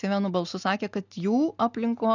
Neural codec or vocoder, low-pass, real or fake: none; 7.2 kHz; real